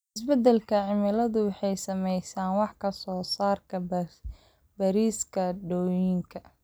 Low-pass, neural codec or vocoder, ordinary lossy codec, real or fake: none; none; none; real